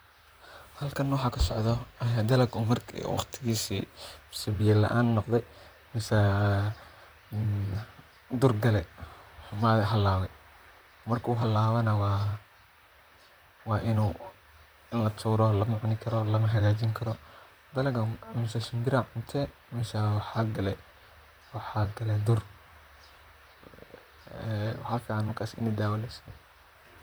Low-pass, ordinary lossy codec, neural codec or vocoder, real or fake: none; none; vocoder, 44.1 kHz, 128 mel bands, Pupu-Vocoder; fake